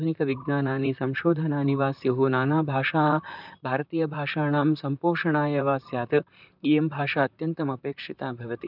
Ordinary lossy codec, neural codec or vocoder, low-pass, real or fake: none; vocoder, 44.1 kHz, 128 mel bands, Pupu-Vocoder; 5.4 kHz; fake